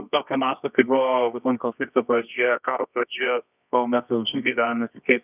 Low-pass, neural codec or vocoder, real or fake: 3.6 kHz; codec, 24 kHz, 0.9 kbps, WavTokenizer, medium music audio release; fake